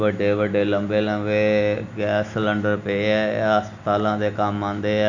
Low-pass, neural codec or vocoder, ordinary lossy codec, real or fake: 7.2 kHz; none; none; real